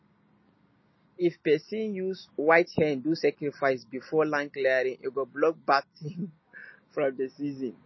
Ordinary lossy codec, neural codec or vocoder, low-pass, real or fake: MP3, 24 kbps; none; 7.2 kHz; real